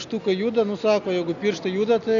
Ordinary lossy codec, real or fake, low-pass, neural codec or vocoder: MP3, 96 kbps; real; 7.2 kHz; none